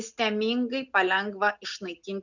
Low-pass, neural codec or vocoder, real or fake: 7.2 kHz; none; real